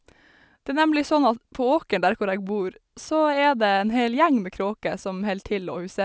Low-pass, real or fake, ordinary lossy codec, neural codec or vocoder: none; real; none; none